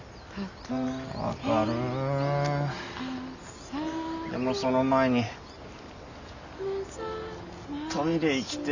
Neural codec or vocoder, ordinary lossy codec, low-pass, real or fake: none; none; 7.2 kHz; real